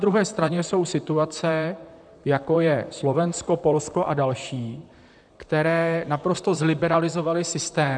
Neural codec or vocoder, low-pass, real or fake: vocoder, 44.1 kHz, 128 mel bands, Pupu-Vocoder; 9.9 kHz; fake